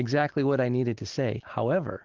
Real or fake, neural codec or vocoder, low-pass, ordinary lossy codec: real; none; 7.2 kHz; Opus, 16 kbps